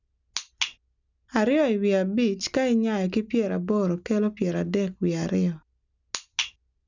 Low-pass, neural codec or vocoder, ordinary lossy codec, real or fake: 7.2 kHz; none; none; real